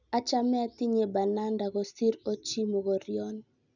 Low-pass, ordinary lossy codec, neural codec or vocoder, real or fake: 7.2 kHz; none; none; real